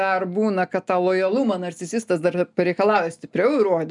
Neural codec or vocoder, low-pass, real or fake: none; 10.8 kHz; real